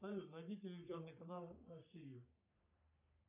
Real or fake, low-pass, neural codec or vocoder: fake; 3.6 kHz; codec, 44.1 kHz, 3.4 kbps, Pupu-Codec